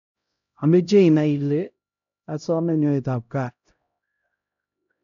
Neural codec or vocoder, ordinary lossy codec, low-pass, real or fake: codec, 16 kHz, 0.5 kbps, X-Codec, HuBERT features, trained on LibriSpeech; none; 7.2 kHz; fake